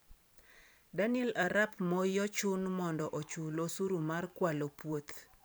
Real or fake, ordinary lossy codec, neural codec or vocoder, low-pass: fake; none; vocoder, 44.1 kHz, 128 mel bands every 512 samples, BigVGAN v2; none